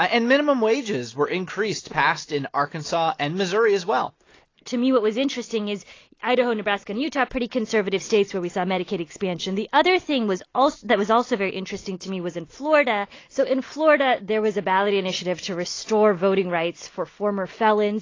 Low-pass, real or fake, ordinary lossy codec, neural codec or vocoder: 7.2 kHz; real; AAC, 32 kbps; none